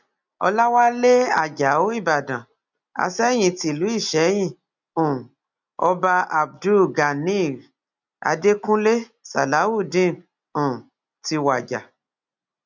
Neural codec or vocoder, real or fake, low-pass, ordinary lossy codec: none; real; 7.2 kHz; none